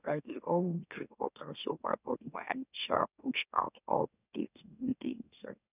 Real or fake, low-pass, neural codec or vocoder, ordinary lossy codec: fake; 3.6 kHz; autoencoder, 44.1 kHz, a latent of 192 numbers a frame, MeloTTS; none